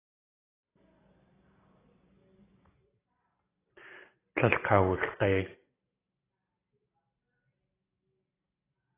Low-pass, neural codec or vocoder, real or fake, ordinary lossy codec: 3.6 kHz; none; real; AAC, 16 kbps